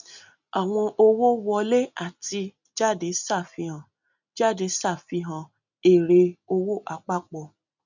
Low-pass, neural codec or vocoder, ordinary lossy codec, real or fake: 7.2 kHz; none; none; real